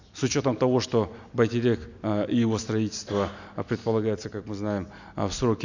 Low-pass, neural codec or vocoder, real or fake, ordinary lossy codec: 7.2 kHz; none; real; none